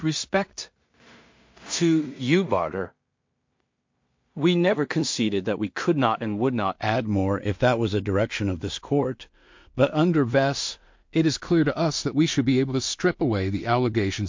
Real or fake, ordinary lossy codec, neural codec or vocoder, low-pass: fake; MP3, 48 kbps; codec, 16 kHz in and 24 kHz out, 0.4 kbps, LongCat-Audio-Codec, two codebook decoder; 7.2 kHz